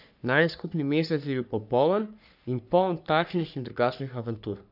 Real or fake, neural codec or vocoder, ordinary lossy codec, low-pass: fake; codec, 44.1 kHz, 3.4 kbps, Pupu-Codec; none; 5.4 kHz